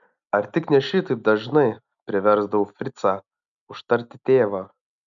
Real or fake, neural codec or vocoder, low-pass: real; none; 7.2 kHz